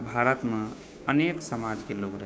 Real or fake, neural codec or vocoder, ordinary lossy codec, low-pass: fake; codec, 16 kHz, 6 kbps, DAC; none; none